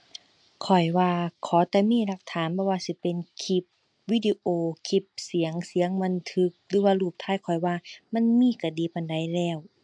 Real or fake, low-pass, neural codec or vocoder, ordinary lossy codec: real; 9.9 kHz; none; MP3, 64 kbps